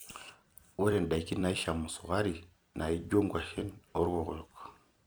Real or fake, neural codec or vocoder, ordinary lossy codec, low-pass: fake; vocoder, 44.1 kHz, 128 mel bands every 256 samples, BigVGAN v2; none; none